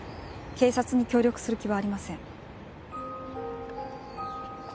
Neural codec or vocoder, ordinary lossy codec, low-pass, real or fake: none; none; none; real